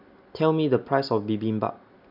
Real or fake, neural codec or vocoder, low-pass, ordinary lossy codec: real; none; 5.4 kHz; none